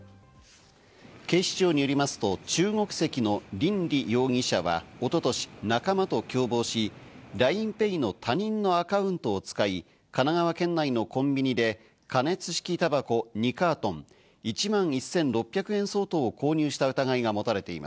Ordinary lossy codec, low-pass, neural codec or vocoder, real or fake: none; none; none; real